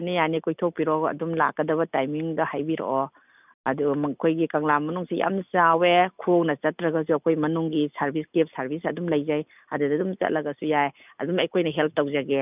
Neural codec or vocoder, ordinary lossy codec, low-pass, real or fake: none; none; 3.6 kHz; real